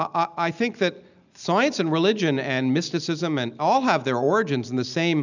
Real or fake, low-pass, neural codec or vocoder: real; 7.2 kHz; none